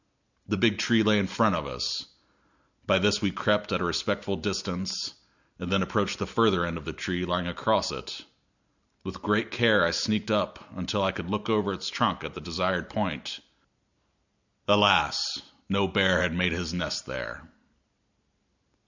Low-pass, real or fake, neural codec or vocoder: 7.2 kHz; real; none